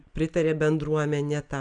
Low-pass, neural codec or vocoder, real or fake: 9.9 kHz; none; real